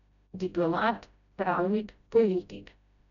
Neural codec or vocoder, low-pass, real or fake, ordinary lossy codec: codec, 16 kHz, 0.5 kbps, FreqCodec, smaller model; 7.2 kHz; fake; none